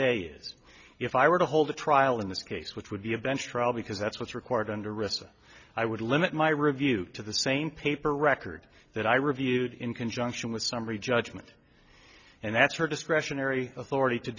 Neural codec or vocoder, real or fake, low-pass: none; real; 7.2 kHz